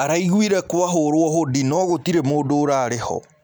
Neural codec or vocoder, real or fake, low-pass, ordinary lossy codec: none; real; none; none